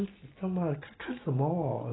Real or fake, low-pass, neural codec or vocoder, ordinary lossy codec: real; 7.2 kHz; none; AAC, 16 kbps